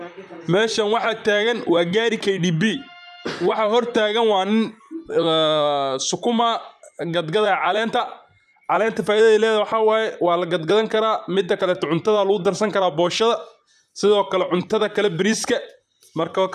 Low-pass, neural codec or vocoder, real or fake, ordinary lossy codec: 14.4 kHz; vocoder, 44.1 kHz, 128 mel bands, Pupu-Vocoder; fake; none